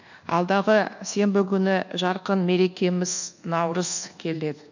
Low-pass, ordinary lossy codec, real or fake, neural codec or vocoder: 7.2 kHz; none; fake; codec, 24 kHz, 1.2 kbps, DualCodec